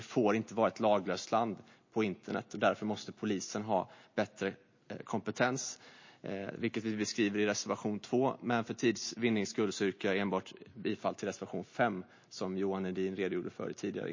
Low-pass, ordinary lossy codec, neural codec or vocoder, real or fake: 7.2 kHz; MP3, 32 kbps; none; real